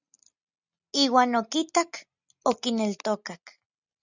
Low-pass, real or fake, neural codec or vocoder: 7.2 kHz; real; none